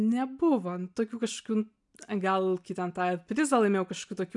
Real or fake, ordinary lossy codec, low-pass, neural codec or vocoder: real; MP3, 96 kbps; 10.8 kHz; none